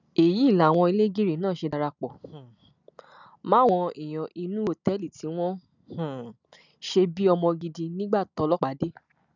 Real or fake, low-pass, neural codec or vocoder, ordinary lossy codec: real; 7.2 kHz; none; none